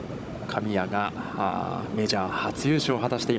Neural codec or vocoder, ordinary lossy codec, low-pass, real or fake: codec, 16 kHz, 16 kbps, FunCodec, trained on Chinese and English, 50 frames a second; none; none; fake